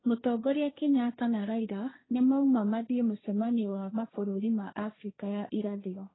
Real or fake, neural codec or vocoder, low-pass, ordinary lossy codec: fake; codec, 16 kHz, 1.1 kbps, Voila-Tokenizer; 7.2 kHz; AAC, 16 kbps